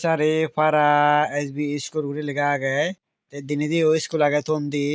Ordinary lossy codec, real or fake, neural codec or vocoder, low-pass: none; real; none; none